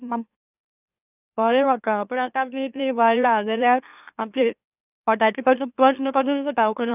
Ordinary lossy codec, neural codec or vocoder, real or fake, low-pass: none; autoencoder, 44.1 kHz, a latent of 192 numbers a frame, MeloTTS; fake; 3.6 kHz